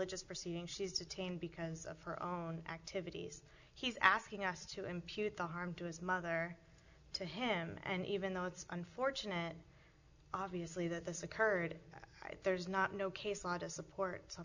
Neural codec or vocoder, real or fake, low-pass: none; real; 7.2 kHz